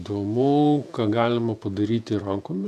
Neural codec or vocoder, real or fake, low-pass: vocoder, 48 kHz, 128 mel bands, Vocos; fake; 14.4 kHz